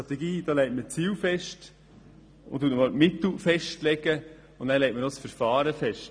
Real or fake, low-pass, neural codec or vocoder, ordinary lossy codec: real; none; none; none